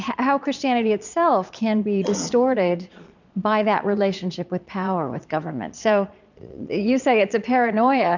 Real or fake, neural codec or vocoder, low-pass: fake; vocoder, 22.05 kHz, 80 mel bands, Vocos; 7.2 kHz